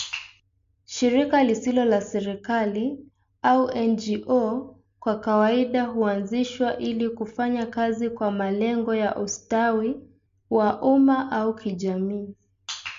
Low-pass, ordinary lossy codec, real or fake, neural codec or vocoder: 7.2 kHz; MP3, 48 kbps; real; none